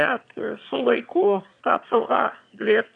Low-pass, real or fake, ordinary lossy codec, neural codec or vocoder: 9.9 kHz; fake; AAC, 64 kbps; autoencoder, 22.05 kHz, a latent of 192 numbers a frame, VITS, trained on one speaker